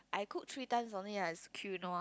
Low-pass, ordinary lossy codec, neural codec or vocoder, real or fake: none; none; none; real